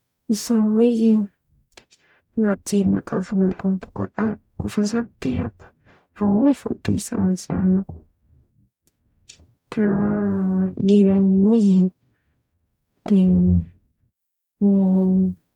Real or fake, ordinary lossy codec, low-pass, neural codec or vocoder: fake; none; 19.8 kHz; codec, 44.1 kHz, 0.9 kbps, DAC